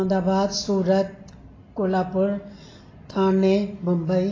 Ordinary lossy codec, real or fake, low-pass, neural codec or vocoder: AAC, 32 kbps; real; 7.2 kHz; none